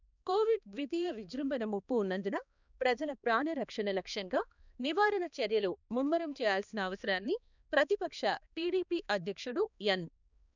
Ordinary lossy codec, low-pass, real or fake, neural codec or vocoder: none; 7.2 kHz; fake; codec, 16 kHz, 2 kbps, X-Codec, HuBERT features, trained on balanced general audio